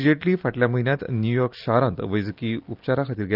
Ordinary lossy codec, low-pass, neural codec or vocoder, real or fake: Opus, 24 kbps; 5.4 kHz; none; real